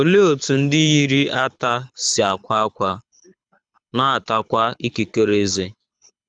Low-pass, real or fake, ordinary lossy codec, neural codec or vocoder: 9.9 kHz; fake; none; codec, 24 kHz, 6 kbps, HILCodec